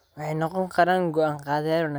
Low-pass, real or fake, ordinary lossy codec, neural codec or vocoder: none; real; none; none